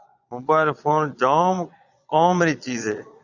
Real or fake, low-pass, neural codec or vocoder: fake; 7.2 kHz; vocoder, 22.05 kHz, 80 mel bands, Vocos